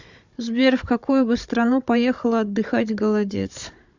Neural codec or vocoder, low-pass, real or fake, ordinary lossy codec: codec, 16 kHz, 16 kbps, FunCodec, trained on Chinese and English, 50 frames a second; 7.2 kHz; fake; Opus, 64 kbps